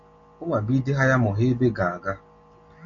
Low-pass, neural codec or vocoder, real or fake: 7.2 kHz; none; real